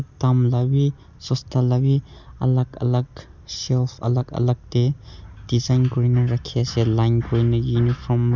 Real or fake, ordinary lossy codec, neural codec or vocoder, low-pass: real; none; none; 7.2 kHz